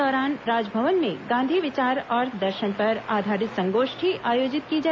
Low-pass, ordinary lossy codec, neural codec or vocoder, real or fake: none; none; none; real